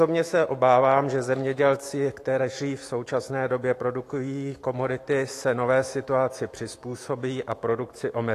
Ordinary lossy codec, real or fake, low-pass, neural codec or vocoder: AAC, 48 kbps; fake; 14.4 kHz; autoencoder, 48 kHz, 128 numbers a frame, DAC-VAE, trained on Japanese speech